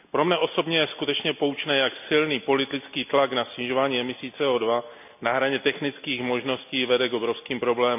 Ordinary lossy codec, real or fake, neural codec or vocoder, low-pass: none; real; none; 3.6 kHz